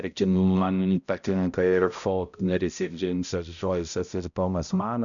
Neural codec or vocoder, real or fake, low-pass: codec, 16 kHz, 0.5 kbps, X-Codec, HuBERT features, trained on balanced general audio; fake; 7.2 kHz